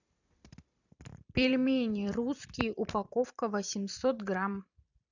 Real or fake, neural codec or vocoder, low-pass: fake; vocoder, 44.1 kHz, 128 mel bands every 256 samples, BigVGAN v2; 7.2 kHz